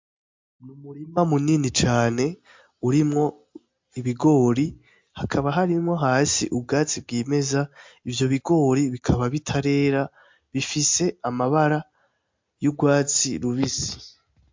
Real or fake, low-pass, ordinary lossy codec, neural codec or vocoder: real; 7.2 kHz; MP3, 48 kbps; none